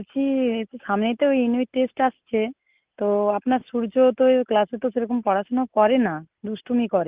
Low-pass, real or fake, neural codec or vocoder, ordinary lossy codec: 3.6 kHz; real; none; Opus, 32 kbps